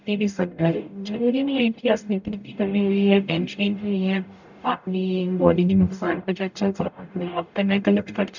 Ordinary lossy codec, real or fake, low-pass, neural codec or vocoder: none; fake; 7.2 kHz; codec, 44.1 kHz, 0.9 kbps, DAC